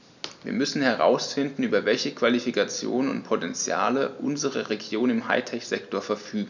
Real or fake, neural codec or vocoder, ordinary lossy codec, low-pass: real; none; none; 7.2 kHz